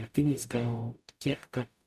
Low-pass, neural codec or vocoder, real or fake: 14.4 kHz; codec, 44.1 kHz, 0.9 kbps, DAC; fake